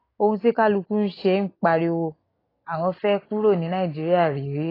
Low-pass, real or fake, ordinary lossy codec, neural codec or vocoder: 5.4 kHz; real; AAC, 24 kbps; none